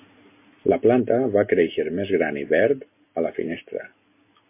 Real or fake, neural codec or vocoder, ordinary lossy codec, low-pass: real; none; MP3, 24 kbps; 3.6 kHz